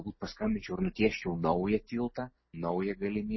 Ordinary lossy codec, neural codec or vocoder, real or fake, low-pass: MP3, 24 kbps; none; real; 7.2 kHz